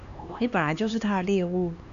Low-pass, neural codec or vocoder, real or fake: 7.2 kHz; codec, 16 kHz, 2 kbps, X-Codec, HuBERT features, trained on LibriSpeech; fake